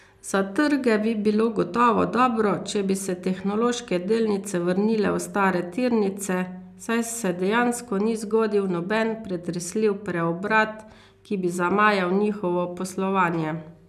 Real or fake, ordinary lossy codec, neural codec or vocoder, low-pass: real; none; none; 14.4 kHz